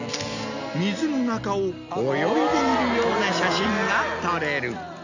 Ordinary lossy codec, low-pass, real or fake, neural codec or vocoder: none; 7.2 kHz; real; none